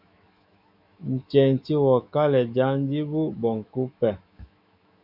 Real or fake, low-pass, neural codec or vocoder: fake; 5.4 kHz; autoencoder, 48 kHz, 128 numbers a frame, DAC-VAE, trained on Japanese speech